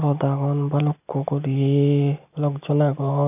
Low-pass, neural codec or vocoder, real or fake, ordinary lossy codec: 3.6 kHz; none; real; none